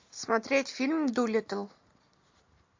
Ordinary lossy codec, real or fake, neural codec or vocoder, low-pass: MP3, 48 kbps; real; none; 7.2 kHz